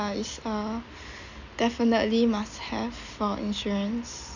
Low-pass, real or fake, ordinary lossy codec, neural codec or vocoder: 7.2 kHz; real; none; none